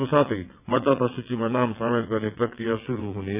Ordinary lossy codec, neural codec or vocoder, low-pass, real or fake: none; vocoder, 22.05 kHz, 80 mel bands, WaveNeXt; 3.6 kHz; fake